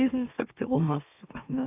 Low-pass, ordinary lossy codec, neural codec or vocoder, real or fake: 3.6 kHz; AAC, 32 kbps; autoencoder, 44.1 kHz, a latent of 192 numbers a frame, MeloTTS; fake